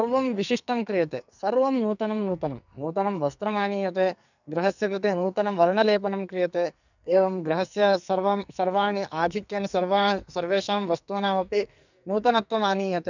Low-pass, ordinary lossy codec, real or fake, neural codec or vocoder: 7.2 kHz; none; fake; codec, 44.1 kHz, 2.6 kbps, SNAC